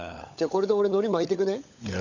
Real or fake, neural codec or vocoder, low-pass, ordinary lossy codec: fake; codec, 16 kHz, 16 kbps, FunCodec, trained on LibriTTS, 50 frames a second; 7.2 kHz; none